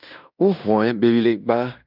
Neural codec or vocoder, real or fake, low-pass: codec, 16 kHz in and 24 kHz out, 0.9 kbps, LongCat-Audio-Codec, fine tuned four codebook decoder; fake; 5.4 kHz